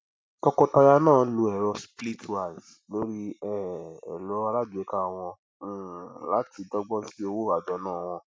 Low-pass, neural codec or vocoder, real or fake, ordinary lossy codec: none; none; real; none